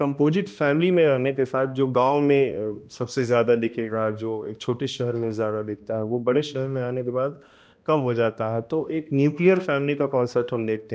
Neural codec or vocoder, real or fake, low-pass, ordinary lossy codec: codec, 16 kHz, 1 kbps, X-Codec, HuBERT features, trained on balanced general audio; fake; none; none